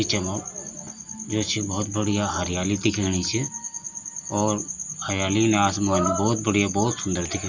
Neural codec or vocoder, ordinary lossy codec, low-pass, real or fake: none; Opus, 64 kbps; 7.2 kHz; real